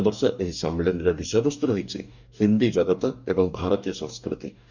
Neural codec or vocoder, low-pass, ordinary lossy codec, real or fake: codec, 44.1 kHz, 2.6 kbps, DAC; 7.2 kHz; none; fake